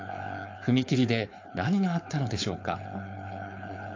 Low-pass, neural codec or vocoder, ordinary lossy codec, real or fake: 7.2 kHz; codec, 16 kHz, 4.8 kbps, FACodec; AAC, 48 kbps; fake